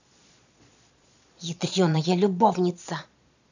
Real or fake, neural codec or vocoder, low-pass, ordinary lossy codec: fake; vocoder, 22.05 kHz, 80 mel bands, Vocos; 7.2 kHz; none